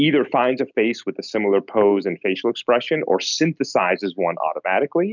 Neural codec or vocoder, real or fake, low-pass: none; real; 7.2 kHz